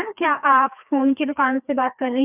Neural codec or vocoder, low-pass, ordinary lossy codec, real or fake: codec, 16 kHz, 2 kbps, FreqCodec, larger model; 3.6 kHz; none; fake